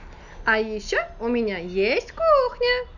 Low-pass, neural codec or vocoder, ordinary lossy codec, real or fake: 7.2 kHz; none; none; real